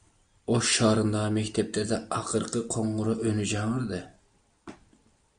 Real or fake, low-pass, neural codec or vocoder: real; 9.9 kHz; none